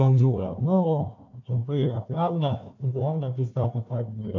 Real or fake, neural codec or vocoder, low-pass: fake; codec, 16 kHz, 1 kbps, FunCodec, trained on Chinese and English, 50 frames a second; 7.2 kHz